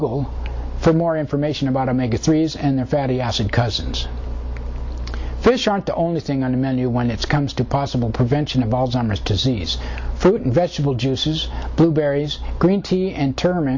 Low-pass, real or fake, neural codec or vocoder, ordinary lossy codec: 7.2 kHz; real; none; MP3, 64 kbps